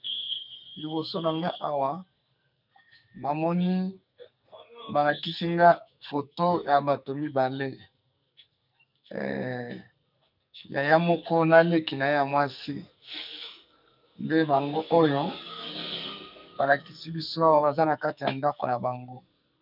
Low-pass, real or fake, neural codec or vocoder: 5.4 kHz; fake; codec, 44.1 kHz, 2.6 kbps, SNAC